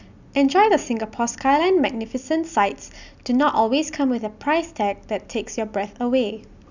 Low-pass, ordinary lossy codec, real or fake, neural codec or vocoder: 7.2 kHz; none; real; none